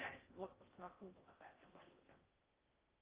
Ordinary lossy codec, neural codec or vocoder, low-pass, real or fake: Opus, 32 kbps; codec, 16 kHz in and 24 kHz out, 0.6 kbps, FocalCodec, streaming, 4096 codes; 3.6 kHz; fake